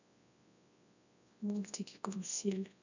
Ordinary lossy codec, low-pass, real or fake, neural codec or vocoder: none; 7.2 kHz; fake; codec, 24 kHz, 0.9 kbps, WavTokenizer, large speech release